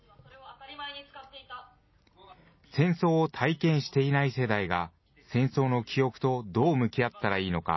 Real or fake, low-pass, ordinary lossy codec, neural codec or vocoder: real; 7.2 kHz; MP3, 24 kbps; none